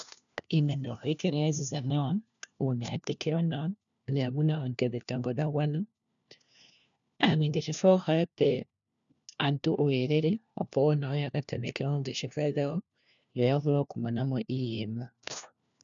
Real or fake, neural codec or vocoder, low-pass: fake; codec, 16 kHz, 1 kbps, FunCodec, trained on LibriTTS, 50 frames a second; 7.2 kHz